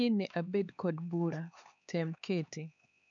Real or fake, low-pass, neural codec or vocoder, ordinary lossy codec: fake; 7.2 kHz; codec, 16 kHz, 4 kbps, X-Codec, HuBERT features, trained on LibriSpeech; none